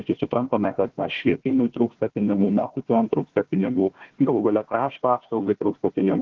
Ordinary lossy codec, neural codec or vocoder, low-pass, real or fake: Opus, 16 kbps; codec, 16 kHz, 1 kbps, FunCodec, trained on Chinese and English, 50 frames a second; 7.2 kHz; fake